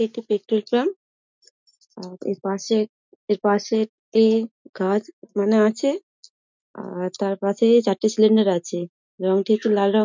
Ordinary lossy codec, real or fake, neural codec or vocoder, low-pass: MP3, 64 kbps; real; none; 7.2 kHz